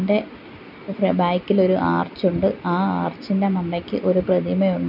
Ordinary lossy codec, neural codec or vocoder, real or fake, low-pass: none; none; real; 5.4 kHz